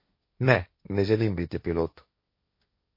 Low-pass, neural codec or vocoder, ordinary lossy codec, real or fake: 5.4 kHz; codec, 16 kHz, 1.1 kbps, Voila-Tokenizer; MP3, 32 kbps; fake